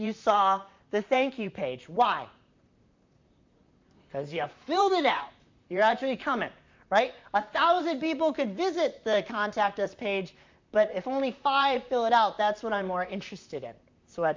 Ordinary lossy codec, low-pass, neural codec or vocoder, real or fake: MP3, 64 kbps; 7.2 kHz; vocoder, 44.1 kHz, 128 mel bands, Pupu-Vocoder; fake